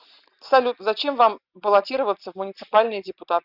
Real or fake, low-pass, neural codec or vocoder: real; 5.4 kHz; none